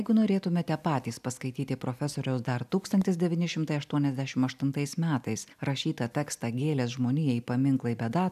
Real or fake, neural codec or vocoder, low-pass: real; none; 14.4 kHz